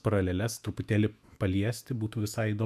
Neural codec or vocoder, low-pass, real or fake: autoencoder, 48 kHz, 128 numbers a frame, DAC-VAE, trained on Japanese speech; 14.4 kHz; fake